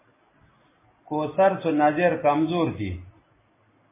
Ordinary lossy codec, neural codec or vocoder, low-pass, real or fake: MP3, 16 kbps; none; 3.6 kHz; real